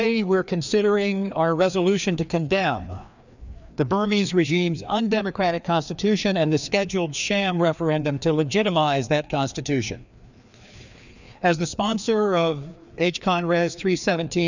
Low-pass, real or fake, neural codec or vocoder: 7.2 kHz; fake; codec, 16 kHz, 2 kbps, FreqCodec, larger model